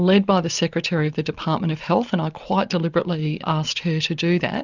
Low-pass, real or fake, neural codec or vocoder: 7.2 kHz; real; none